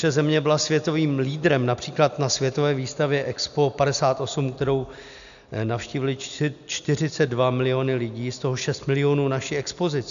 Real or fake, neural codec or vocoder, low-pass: real; none; 7.2 kHz